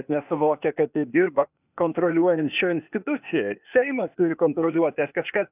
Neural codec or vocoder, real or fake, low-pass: codec, 16 kHz, 0.8 kbps, ZipCodec; fake; 3.6 kHz